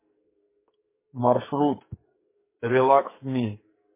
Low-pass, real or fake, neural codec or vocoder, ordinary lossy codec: 3.6 kHz; fake; codec, 44.1 kHz, 2.6 kbps, SNAC; MP3, 16 kbps